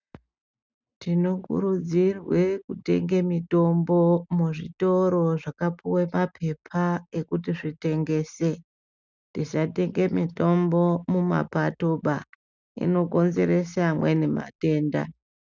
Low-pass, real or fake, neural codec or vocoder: 7.2 kHz; real; none